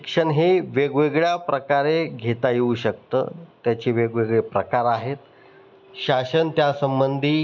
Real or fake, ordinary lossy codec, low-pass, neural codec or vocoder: real; none; 7.2 kHz; none